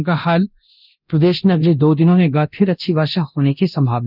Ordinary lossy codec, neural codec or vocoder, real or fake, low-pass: none; codec, 24 kHz, 0.9 kbps, DualCodec; fake; 5.4 kHz